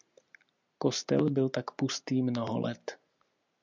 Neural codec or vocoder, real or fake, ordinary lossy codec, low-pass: none; real; MP3, 64 kbps; 7.2 kHz